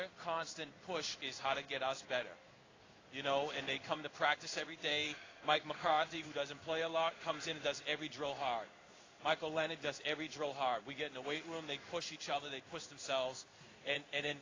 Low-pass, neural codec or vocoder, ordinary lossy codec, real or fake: 7.2 kHz; codec, 16 kHz in and 24 kHz out, 1 kbps, XY-Tokenizer; AAC, 32 kbps; fake